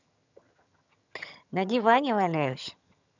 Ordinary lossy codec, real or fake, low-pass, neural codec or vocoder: none; fake; 7.2 kHz; vocoder, 22.05 kHz, 80 mel bands, HiFi-GAN